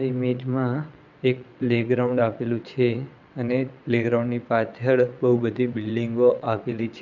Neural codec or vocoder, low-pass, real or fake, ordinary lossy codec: vocoder, 22.05 kHz, 80 mel bands, WaveNeXt; 7.2 kHz; fake; none